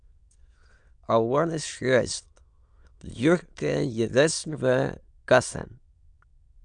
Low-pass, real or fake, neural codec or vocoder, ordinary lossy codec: 9.9 kHz; fake; autoencoder, 22.05 kHz, a latent of 192 numbers a frame, VITS, trained on many speakers; MP3, 96 kbps